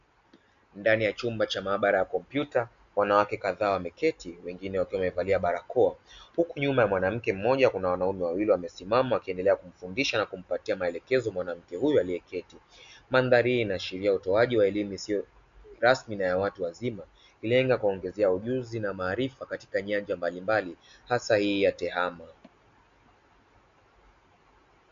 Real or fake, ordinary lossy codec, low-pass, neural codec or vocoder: real; MP3, 64 kbps; 7.2 kHz; none